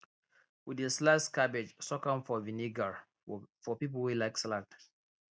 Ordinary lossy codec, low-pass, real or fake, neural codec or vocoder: none; none; real; none